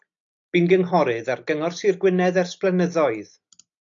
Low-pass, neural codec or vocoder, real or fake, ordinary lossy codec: 7.2 kHz; none; real; AAC, 64 kbps